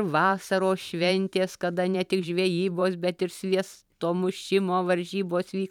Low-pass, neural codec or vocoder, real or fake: 19.8 kHz; vocoder, 44.1 kHz, 128 mel bands every 512 samples, BigVGAN v2; fake